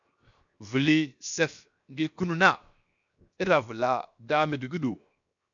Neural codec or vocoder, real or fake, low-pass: codec, 16 kHz, 0.7 kbps, FocalCodec; fake; 7.2 kHz